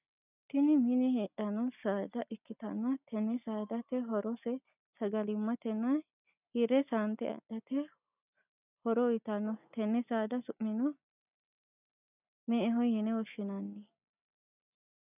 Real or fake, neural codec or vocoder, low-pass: real; none; 3.6 kHz